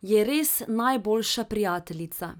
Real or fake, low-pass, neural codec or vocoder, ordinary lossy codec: real; none; none; none